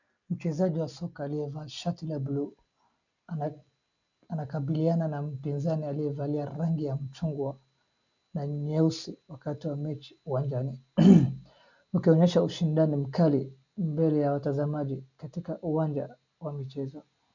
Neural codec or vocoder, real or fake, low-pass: none; real; 7.2 kHz